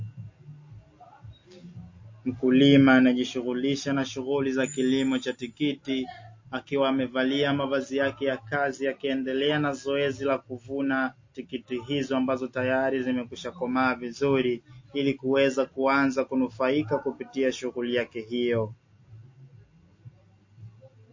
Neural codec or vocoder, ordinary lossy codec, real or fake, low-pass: none; MP3, 32 kbps; real; 7.2 kHz